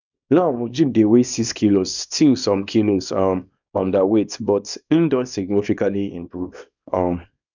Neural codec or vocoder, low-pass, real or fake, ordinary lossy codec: codec, 24 kHz, 0.9 kbps, WavTokenizer, small release; 7.2 kHz; fake; none